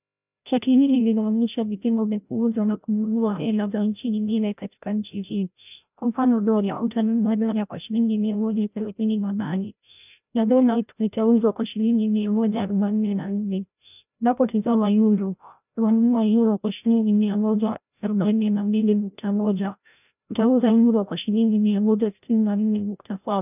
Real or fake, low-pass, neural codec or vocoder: fake; 3.6 kHz; codec, 16 kHz, 0.5 kbps, FreqCodec, larger model